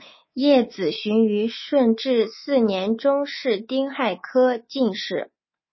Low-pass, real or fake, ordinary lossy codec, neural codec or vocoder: 7.2 kHz; fake; MP3, 24 kbps; codec, 24 kHz, 3.1 kbps, DualCodec